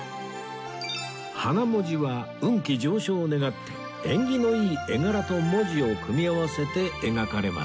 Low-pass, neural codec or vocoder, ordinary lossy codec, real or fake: none; none; none; real